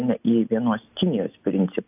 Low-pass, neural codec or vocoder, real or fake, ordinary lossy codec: 3.6 kHz; none; real; AAC, 32 kbps